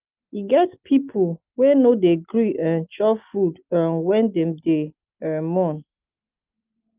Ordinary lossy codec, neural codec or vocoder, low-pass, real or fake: Opus, 24 kbps; none; 3.6 kHz; real